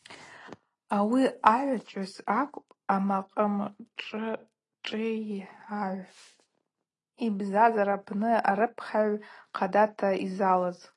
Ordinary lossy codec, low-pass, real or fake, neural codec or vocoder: AAC, 32 kbps; 10.8 kHz; real; none